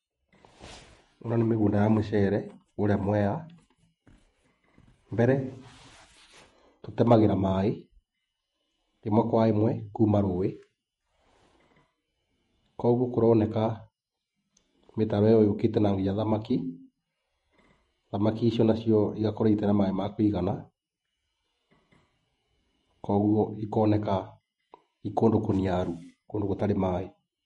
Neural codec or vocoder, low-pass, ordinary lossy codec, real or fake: vocoder, 48 kHz, 128 mel bands, Vocos; 19.8 kHz; MP3, 48 kbps; fake